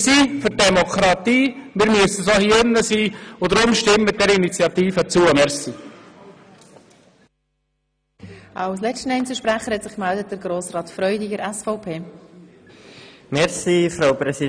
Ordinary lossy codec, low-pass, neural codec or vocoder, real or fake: none; 9.9 kHz; none; real